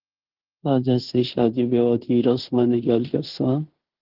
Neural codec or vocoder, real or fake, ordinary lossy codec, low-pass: codec, 16 kHz in and 24 kHz out, 0.9 kbps, LongCat-Audio-Codec, fine tuned four codebook decoder; fake; Opus, 16 kbps; 5.4 kHz